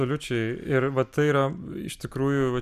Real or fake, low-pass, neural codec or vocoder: real; 14.4 kHz; none